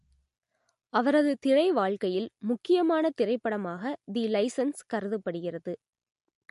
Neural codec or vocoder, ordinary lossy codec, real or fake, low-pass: none; MP3, 48 kbps; real; 14.4 kHz